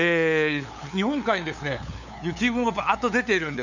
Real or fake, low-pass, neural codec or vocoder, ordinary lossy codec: fake; 7.2 kHz; codec, 16 kHz, 8 kbps, FunCodec, trained on LibriTTS, 25 frames a second; none